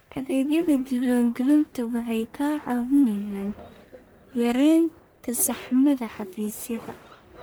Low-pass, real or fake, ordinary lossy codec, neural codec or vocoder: none; fake; none; codec, 44.1 kHz, 1.7 kbps, Pupu-Codec